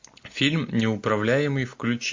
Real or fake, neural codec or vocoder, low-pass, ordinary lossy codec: real; none; 7.2 kHz; MP3, 48 kbps